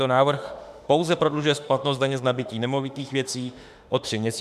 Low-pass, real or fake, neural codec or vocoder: 14.4 kHz; fake; autoencoder, 48 kHz, 32 numbers a frame, DAC-VAE, trained on Japanese speech